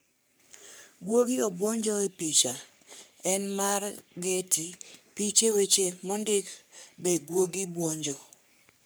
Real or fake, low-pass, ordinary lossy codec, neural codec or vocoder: fake; none; none; codec, 44.1 kHz, 3.4 kbps, Pupu-Codec